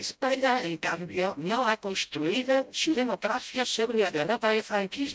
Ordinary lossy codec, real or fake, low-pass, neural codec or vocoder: none; fake; none; codec, 16 kHz, 0.5 kbps, FreqCodec, smaller model